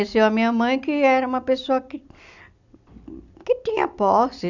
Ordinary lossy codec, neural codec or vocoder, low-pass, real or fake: none; none; 7.2 kHz; real